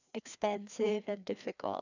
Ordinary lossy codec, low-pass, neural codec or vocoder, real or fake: none; 7.2 kHz; codec, 16 kHz, 2 kbps, FreqCodec, larger model; fake